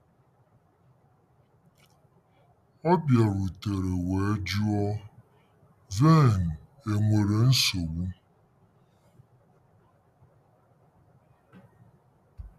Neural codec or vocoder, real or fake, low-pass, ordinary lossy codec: none; real; 14.4 kHz; none